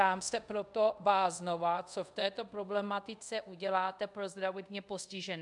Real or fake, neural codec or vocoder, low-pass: fake; codec, 24 kHz, 0.5 kbps, DualCodec; 10.8 kHz